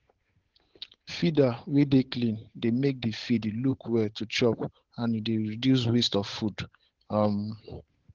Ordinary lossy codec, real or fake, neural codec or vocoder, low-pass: Opus, 16 kbps; fake; codec, 16 kHz, 8 kbps, FunCodec, trained on Chinese and English, 25 frames a second; 7.2 kHz